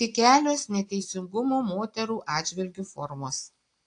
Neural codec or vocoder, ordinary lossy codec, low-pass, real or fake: none; AAC, 48 kbps; 9.9 kHz; real